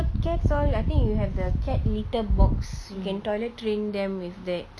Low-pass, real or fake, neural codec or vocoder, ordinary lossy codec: none; real; none; none